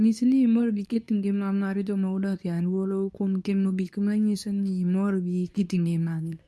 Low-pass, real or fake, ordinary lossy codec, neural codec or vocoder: none; fake; none; codec, 24 kHz, 0.9 kbps, WavTokenizer, medium speech release version 2